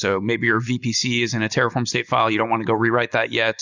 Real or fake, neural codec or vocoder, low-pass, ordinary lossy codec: real; none; 7.2 kHz; Opus, 64 kbps